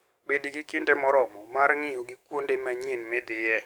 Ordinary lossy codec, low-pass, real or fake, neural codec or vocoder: none; none; fake; codec, 44.1 kHz, 7.8 kbps, DAC